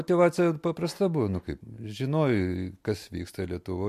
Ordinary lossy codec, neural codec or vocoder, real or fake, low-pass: MP3, 64 kbps; none; real; 14.4 kHz